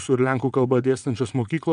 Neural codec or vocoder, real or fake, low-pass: vocoder, 22.05 kHz, 80 mel bands, Vocos; fake; 9.9 kHz